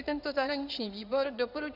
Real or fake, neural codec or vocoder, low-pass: fake; codec, 16 kHz in and 24 kHz out, 2.2 kbps, FireRedTTS-2 codec; 5.4 kHz